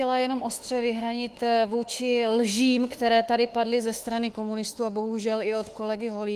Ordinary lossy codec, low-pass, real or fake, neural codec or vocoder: Opus, 24 kbps; 14.4 kHz; fake; autoencoder, 48 kHz, 32 numbers a frame, DAC-VAE, trained on Japanese speech